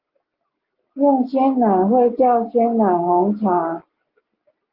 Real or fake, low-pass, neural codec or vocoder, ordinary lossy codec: real; 5.4 kHz; none; Opus, 32 kbps